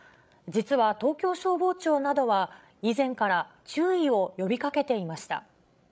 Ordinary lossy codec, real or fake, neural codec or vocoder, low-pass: none; fake; codec, 16 kHz, 16 kbps, FreqCodec, larger model; none